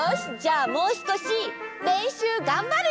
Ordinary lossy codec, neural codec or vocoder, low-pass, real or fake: none; none; none; real